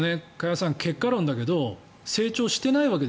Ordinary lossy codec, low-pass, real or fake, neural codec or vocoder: none; none; real; none